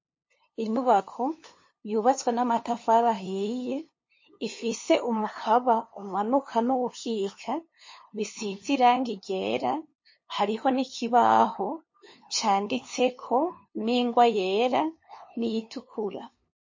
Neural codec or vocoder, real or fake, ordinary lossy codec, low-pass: codec, 16 kHz, 2 kbps, FunCodec, trained on LibriTTS, 25 frames a second; fake; MP3, 32 kbps; 7.2 kHz